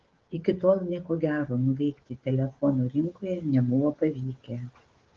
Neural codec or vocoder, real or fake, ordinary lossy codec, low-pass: codec, 16 kHz, 16 kbps, FreqCodec, smaller model; fake; Opus, 16 kbps; 7.2 kHz